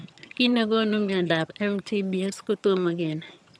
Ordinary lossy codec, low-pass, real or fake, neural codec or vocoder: none; none; fake; vocoder, 22.05 kHz, 80 mel bands, HiFi-GAN